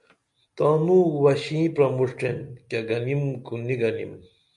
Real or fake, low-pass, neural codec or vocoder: real; 10.8 kHz; none